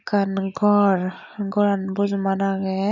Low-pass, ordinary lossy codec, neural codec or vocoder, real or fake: 7.2 kHz; none; none; real